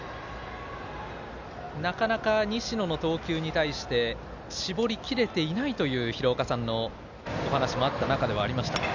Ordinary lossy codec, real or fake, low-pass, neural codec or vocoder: none; real; 7.2 kHz; none